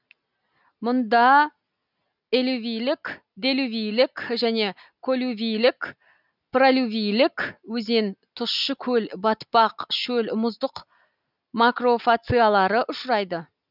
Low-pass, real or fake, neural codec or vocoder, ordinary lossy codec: 5.4 kHz; real; none; none